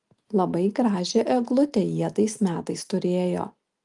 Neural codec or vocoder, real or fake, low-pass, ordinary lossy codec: none; real; 10.8 kHz; Opus, 32 kbps